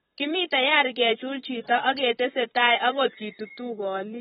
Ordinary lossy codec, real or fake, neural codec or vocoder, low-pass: AAC, 16 kbps; fake; vocoder, 44.1 kHz, 128 mel bands, Pupu-Vocoder; 19.8 kHz